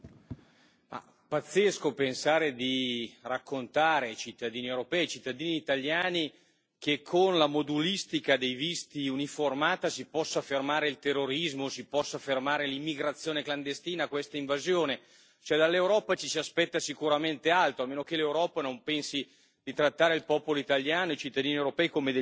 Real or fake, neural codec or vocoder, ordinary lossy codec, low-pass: real; none; none; none